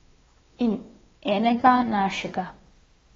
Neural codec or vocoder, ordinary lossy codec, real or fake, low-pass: codec, 16 kHz, 2 kbps, X-Codec, WavLM features, trained on Multilingual LibriSpeech; AAC, 24 kbps; fake; 7.2 kHz